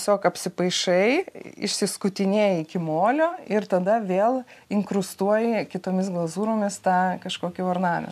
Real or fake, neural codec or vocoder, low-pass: real; none; 14.4 kHz